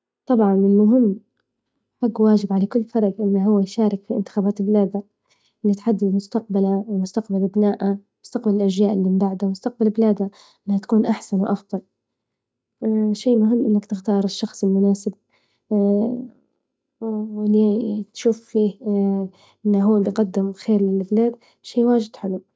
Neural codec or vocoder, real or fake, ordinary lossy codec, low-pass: none; real; none; none